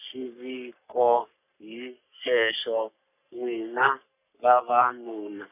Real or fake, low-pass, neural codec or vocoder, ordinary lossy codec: fake; 3.6 kHz; codec, 44.1 kHz, 3.4 kbps, Pupu-Codec; none